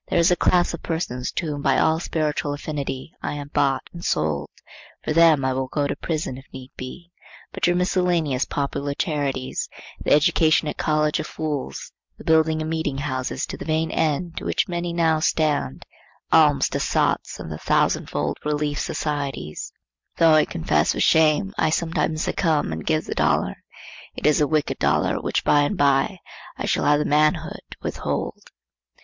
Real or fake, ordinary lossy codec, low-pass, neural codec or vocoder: real; MP3, 64 kbps; 7.2 kHz; none